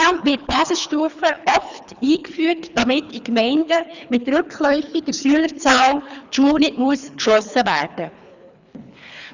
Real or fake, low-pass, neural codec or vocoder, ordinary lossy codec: fake; 7.2 kHz; codec, 24 kHz, 3 kbps, HILCodec; none